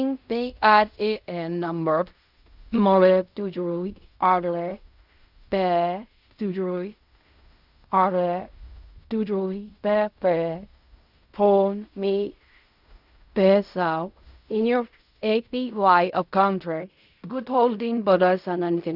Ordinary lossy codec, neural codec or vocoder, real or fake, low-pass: none; codec, 16 kHz in and 24 kHz out, 0.4 kbps, LongCat-Audio-Codec, fine tuned four codebook decoder; fake; 5.4 kHz